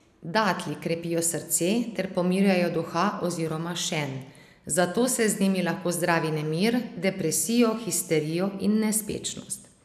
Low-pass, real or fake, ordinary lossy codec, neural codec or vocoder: 14.4 kHz; real; none; none